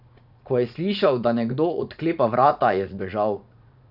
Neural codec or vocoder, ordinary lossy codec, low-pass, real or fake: vocoder, 44.1 kHz, 80 mel bands, Vocos; none; 5.4 kHz; fake